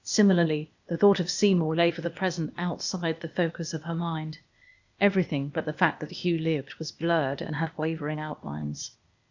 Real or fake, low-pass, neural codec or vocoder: fake; 7.2 kHz; codec, 16 kHz, 0.8 kbps, ZipCodec